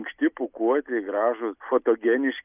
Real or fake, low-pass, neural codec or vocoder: real; 3.6 kHz; none